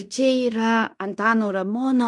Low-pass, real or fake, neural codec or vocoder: 10.8 kHz; fake; codec, 16 kHz in and 24 kHz out, 0.9 kbps, LongCat-Audio-Codec, fine tuned four codebook decoder